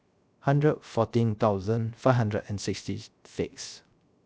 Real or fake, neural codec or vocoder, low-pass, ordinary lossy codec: fake; codec, 16 kHz, 0.3 kbps, FocalCodec; none; none